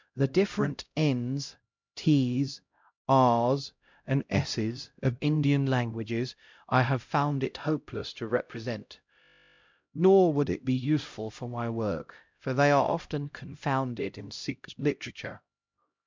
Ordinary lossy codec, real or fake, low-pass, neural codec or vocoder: MP3, 64 kbps; fake; 7.2 kHz; codec, 16 kHz, 0.5 kbps, X-Codec, HuBERT features, trained on LibriSpeech